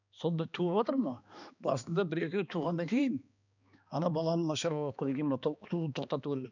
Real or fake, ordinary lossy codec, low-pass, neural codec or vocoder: fake; none; 7.2 kHz; codec, 16 kHz, 2 kbps, X-Codec, HuBERT features, trained on balanced general audio